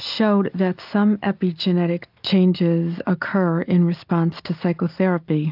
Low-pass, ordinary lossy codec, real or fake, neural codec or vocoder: 5.4 kHz; AAC, 48 kbps; real; none